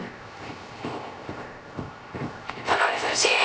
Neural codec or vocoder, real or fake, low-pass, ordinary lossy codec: codec, 16 kHz, 0.3 kbps, FocalCodec; fake; none; none